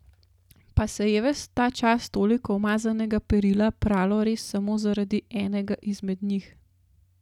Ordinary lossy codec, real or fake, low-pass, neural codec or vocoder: none; real; 19.8 kHz; none